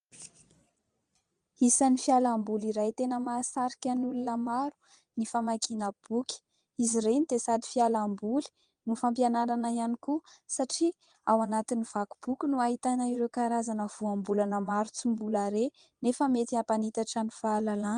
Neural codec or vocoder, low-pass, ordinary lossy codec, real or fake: vocoder, 22.05 kHz, 80 mel bands, Vocos; 9.9 kHz; Opus, 32 kbps; fake